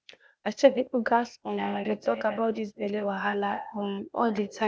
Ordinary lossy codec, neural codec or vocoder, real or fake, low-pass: none; codec, 16 kHz, 0.8 kbps, ZipCodec; fake; none